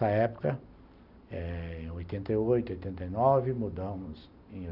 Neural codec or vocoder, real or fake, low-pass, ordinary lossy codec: none; real; 5.4 kHz; none